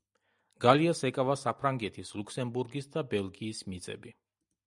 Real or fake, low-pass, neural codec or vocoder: real; 10.8 kHz; none